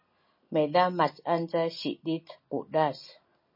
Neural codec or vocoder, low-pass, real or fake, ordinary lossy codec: none; 5.4 kHz; real; MP3, 24 kbps